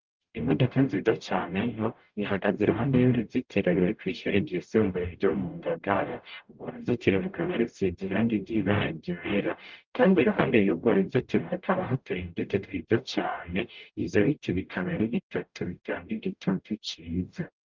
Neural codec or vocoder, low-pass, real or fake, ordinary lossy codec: codec, 44.1 kHz, 0.9 kbps, DAC; 7.2 kHz; fake; Opus, 32 kbps